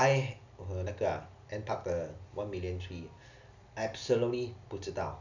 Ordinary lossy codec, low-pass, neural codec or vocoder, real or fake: none; 7.2 kHz; none; real